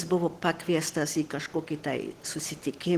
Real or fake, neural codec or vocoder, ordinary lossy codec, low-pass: real; none; Opus, 16 kbps; 14.4 kHz